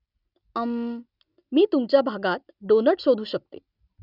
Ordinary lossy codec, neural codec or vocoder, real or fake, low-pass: none; none; real; 5.4 kHz